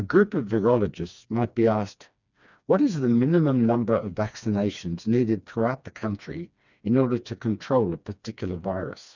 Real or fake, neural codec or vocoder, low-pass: fake; codec, 16 kHz, 2 kbps, FreqCodec, smaller model; 7.2 kHz